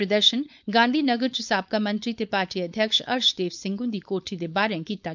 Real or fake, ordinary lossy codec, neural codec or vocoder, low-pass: fake; none; codec, 16 kHz, 4.8 kbps, FACodec; 7.2 kHz